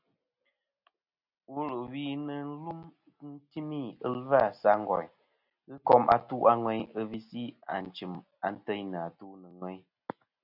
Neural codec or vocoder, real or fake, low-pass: none; real; 5.4 kHz